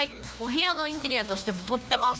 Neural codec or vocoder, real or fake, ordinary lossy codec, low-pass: codec, 16 kHz, 1 kbps, FunCodec, trained on LibriTTS, 50 frames a second; fake; none; none